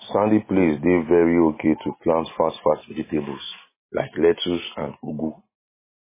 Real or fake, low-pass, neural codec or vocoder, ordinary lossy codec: real; 3.6 kHz; none; MP3, 16 kbps